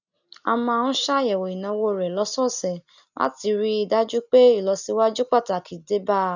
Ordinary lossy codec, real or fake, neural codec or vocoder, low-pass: none; real; none; 7.2 kHz